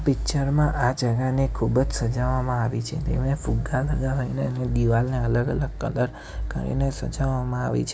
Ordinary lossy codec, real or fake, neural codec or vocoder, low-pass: none; real; none; none